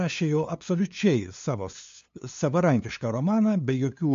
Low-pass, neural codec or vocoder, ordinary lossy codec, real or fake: 7.2 kHz; codec, 16 kHz, 2 kbps, FunCodec, trained on LibriTTS, 25 frames a second; MP3, 48 kbps; fake